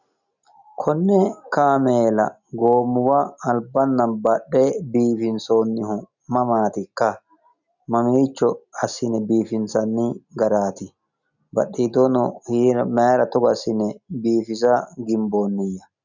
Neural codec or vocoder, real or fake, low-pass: none; real; 7.2 kHz